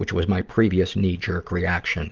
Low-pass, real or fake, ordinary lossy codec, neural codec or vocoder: 7.2 kHz; real; Opus, 16 kbps; none